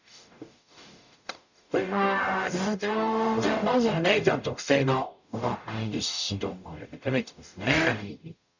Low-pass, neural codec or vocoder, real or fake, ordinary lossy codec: 7.2 kHz; codec, 44.1 kHz, 0.9 kbps, DAC; fake; none